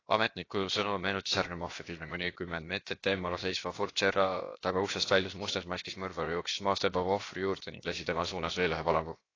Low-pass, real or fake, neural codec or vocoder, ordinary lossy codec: 7.2 kHz; fake; codec, 16 kHz, about 1 kbps, DyCAST, with the encoder's durations; AAC, 32 kbps